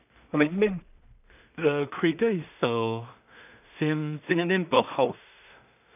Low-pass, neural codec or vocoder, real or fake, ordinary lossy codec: 3.6 kHz; codec, 16 kHz in and 24 kHz out, 0.4 kbps, LongCat-Audio-Codec, two codebook decoder; fake; none